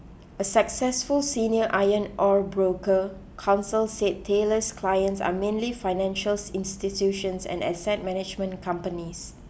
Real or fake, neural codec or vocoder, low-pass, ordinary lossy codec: real; none; none; none